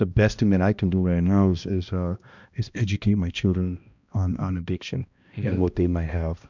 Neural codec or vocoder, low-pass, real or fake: codec, 16 kHz, 1 kbps, X-Codec, HuBERT features, trained on balanced general audio; 7.2 kHz; fake